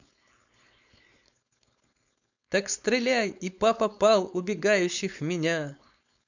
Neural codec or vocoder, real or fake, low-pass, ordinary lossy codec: codec, 16 kHz, 4.8 kbps, FACodec; fake; 7.2 kHz; none